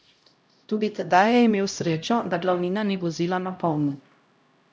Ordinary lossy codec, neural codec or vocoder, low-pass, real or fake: none; codec, 16 kHz, 0.5 kbps, X-Codec, HuBERT features, trained on LibriSpeech; none; fake